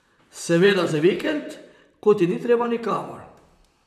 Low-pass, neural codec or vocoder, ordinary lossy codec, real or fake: 14.4 kHz; vocoder, 44.1 kHz, 128 mel bands, Pupu-Vocoder; none; fake